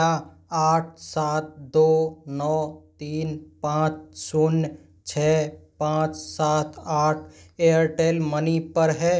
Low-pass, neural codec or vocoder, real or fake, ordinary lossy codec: none; none; real; none